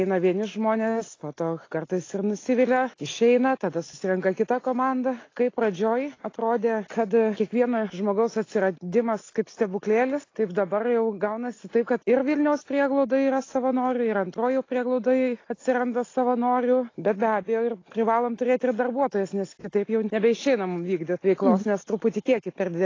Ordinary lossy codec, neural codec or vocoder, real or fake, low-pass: AAC, 32 kbps; none; real; 7.2 kHz